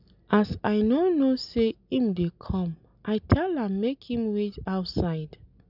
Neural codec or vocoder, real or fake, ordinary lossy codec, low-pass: none; real; none; 5.4 kHz